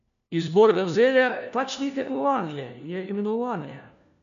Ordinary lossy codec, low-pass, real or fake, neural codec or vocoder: none; 7.2 kHz; fake; codec, 16 kHz, 1 kbps, FunCodec, trained on LibriTTS, 50 frames a second